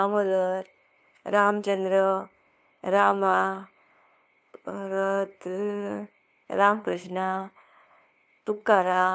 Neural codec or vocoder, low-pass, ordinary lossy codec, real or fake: codec, 16 kHz, 2 kbps, FunCodec, trained on LibriTTS, 25 frames a second; none; none; fake